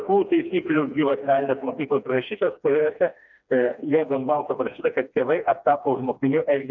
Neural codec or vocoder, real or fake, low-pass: codec, 16 kHz, 2 kbps, FreqCodec, smaller model; fake; 7.2 kHz